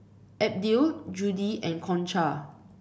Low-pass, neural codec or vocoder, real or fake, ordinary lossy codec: none; none; real; none